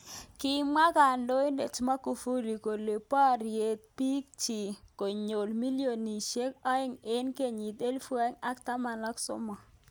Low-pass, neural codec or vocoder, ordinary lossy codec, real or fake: none; none; none; real